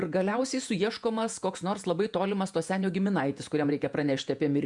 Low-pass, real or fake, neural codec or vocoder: 10.8 kHz; fake; vocoder, 48 kHz, 128 mel bands, Vocos